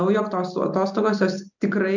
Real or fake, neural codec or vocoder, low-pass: real; none; 7.2 kHz